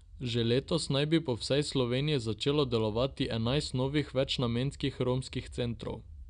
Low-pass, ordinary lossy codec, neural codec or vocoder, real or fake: 9.9 kHz; none; none; real